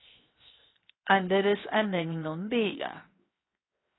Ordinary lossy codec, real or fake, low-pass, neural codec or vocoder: AAC, 16 kbps; fake; 7.2 kHz; codec, 16 kHz, 0.7 kbps, FocalCodec